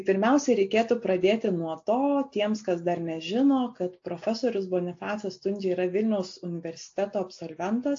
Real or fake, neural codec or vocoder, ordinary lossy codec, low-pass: real; none; AAC, 48 kbps; 7.2 kHz